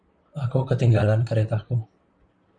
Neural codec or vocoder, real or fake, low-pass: vocoder, 44.1 kHz, 128 mel bands, Pupu-Vocoder; fake; 9.9 kHz